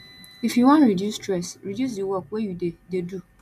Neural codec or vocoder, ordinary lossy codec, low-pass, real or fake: none; none; 14.4 kHz; real